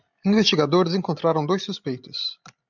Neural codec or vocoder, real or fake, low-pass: none; real; 7.2 kHz